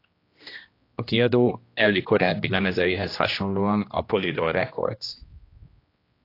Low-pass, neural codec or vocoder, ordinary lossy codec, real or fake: 5.4 kHz; codec, 16 kHz, 1 kbps, X-Codec, HuBERT features, trained on general audio; AAC, 32 kbps; fake